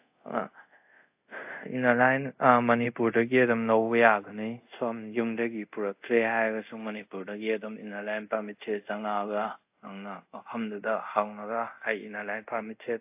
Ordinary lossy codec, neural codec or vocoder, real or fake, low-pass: AAC, 32 kbps; codec, 24 kHz, 0.5 kbps, DualCodec; fake; 3.6 kHz